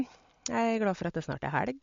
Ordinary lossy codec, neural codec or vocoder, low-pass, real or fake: MP3, 48 kbps; none; 7.2 kHz; real